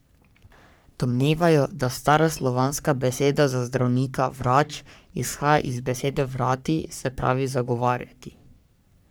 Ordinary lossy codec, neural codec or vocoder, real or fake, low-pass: none; codec, 44.1 kHz, 3.4 kbps, Pupu-Codec; fake; none